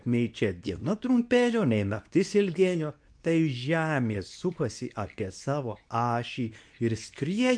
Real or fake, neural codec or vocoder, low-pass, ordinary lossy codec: fake; codec, 24 kHz, 0.9 kbps, WavTokenizer, medium speech release version 2; 9.9 kHz; MP3, 64 kbps